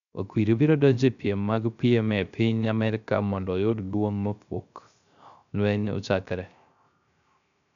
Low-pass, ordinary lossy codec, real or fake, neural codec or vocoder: 7.2 kHz; none; fake; codec, 16 kHz, 0.3 kbps, FocalCodec